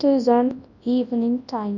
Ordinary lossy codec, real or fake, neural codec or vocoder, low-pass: none; fake; codec, 24 kHz, 0.9 kbps, WavTokenizer, large speech release; 7.2 kHz